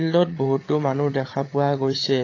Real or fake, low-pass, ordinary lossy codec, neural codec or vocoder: fake; 7.2 kHz; AAC, 32 kbps; codec, 16 kHz, 8 kbps, FreqCodec, larger model